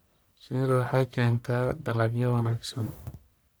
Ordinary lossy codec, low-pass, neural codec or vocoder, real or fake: none; none; codec, 44.1 kHz, 1.7 kbps, Pupu-Codec; fake